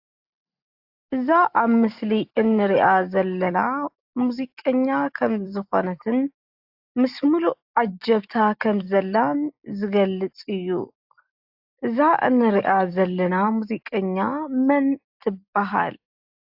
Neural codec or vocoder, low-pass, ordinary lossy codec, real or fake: none; 5.4 kHz; AAC, 48 kbps; real